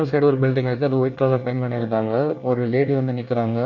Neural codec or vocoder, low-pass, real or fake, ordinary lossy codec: codec, 24 kHz, 1 kbps, SNAC; 7.2 kHz; fake; none